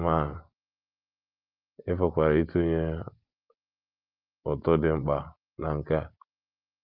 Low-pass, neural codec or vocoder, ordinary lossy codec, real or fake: 5.4 kHz; none; Opus, 24 kbps; real